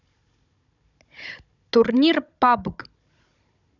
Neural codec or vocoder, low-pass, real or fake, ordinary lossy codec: codec, 16 kHz, 16 kbps, FunCodec, trained on Chinese and English, 50 frames a second; 7.2 kHz; fake; none